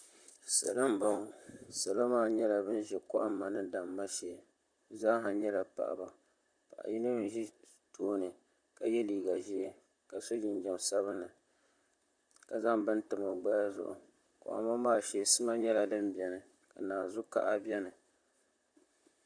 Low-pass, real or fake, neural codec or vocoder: 9.9 kHz; fake; vocoder, 44.1 kHz, 128 mel bands, Pupu-Vocoder